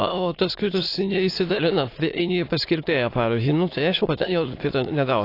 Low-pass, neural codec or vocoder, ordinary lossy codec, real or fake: 5.4 kHz; autoencoder, 22.05 kHz, a latent of 192 numbers a frame, VITS, trained on many speakers; AAC, 32 kbps; fake